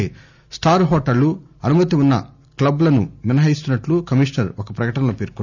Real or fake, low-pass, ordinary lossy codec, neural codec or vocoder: real; 7.2 kHz; none; none